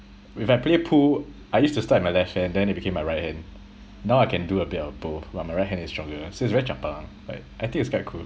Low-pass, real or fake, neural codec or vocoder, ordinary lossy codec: none; real; none; none